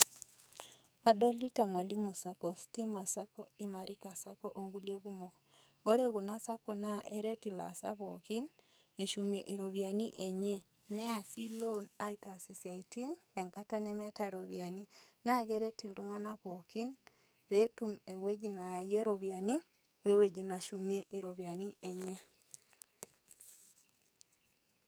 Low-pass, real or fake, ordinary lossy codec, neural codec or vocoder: none; fake; none; codec, 44.1 kHz, 2.6 kbps, SNAC